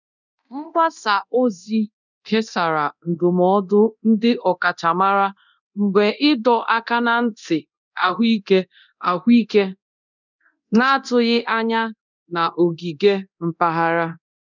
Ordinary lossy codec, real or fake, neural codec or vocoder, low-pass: none; fake; codec, 24 kHz, 0.9 kbps, DualCodec; 7.2 kHz